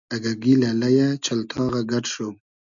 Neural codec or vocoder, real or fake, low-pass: none; real; 7.2 kHz